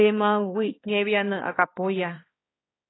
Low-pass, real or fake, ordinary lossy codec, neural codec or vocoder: 7.2 kHz; fake; AAC, 16 kbps; codec, 16 kHz, 2 kbps, X-Codec, HuBERT features, trained on balanced general audio